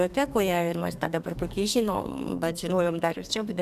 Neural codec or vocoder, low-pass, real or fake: codec, 32 kHz, 1.9 kbps, SNAC; 14.4 kHz; fake